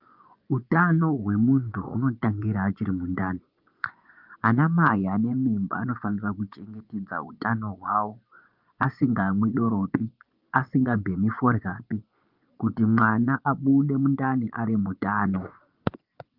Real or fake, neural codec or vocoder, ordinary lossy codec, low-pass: fake; vocoder, 24 kHz, 100 mel bands, Vocos; Opus, 32 kbps; 5.4 kHz